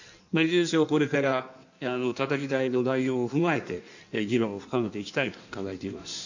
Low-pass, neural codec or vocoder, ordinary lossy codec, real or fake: 7.2 kHz; codec, 16 kHz in and 24 kHz out, 1.1 kbps, FireRedTTS-2 codec; none; fake